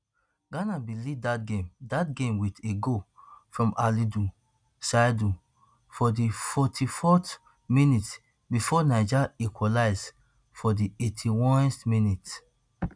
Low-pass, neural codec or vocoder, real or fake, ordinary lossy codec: 9.9 kHz; none; real; none